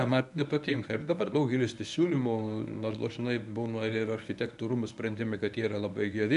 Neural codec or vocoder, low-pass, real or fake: codec, 24 kHz, 0.9 kbps, WavTokenizer, medium speech release version 1; 10.8 kHz; fake